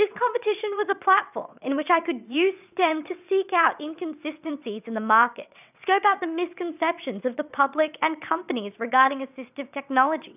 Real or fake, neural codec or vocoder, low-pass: fake; vocoder, 22.05 kHz, 80 mel bands, WaveNeXt; 3.6 kHz